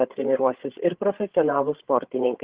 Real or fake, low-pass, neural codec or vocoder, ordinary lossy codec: fake; 3.6 kHz; codec, 16 kHz, 8 kbps, FreqCodec, larger model; Opus, 16 kbps